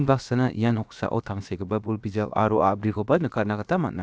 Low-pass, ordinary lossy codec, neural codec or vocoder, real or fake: none; none; codec, 16 kHz, about 1 kbps, DyCAST, with the encoder's durations; fake